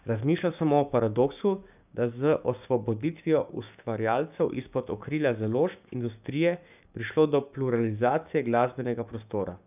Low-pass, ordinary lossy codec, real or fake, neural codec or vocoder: 3.6 kHz; none; fake; codec, 16 kHz, 6 kbps, DAC